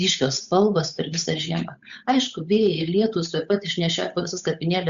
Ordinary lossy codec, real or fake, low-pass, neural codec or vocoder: Opus, 64 kbps; fake; 7.2 kHz; codec, 16 kHz, 8 kbps, FunCodec, trained on Chinese and English, 25 frames a second